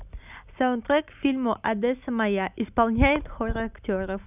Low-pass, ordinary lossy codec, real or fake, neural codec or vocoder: 3.6 kHz; none; real; none